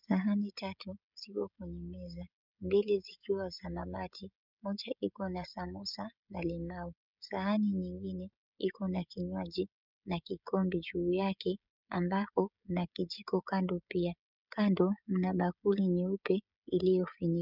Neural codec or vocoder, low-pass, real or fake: codec, 16 kHz, 16 kbps, FreqCodec, smaller model; 5.4 kHz; fake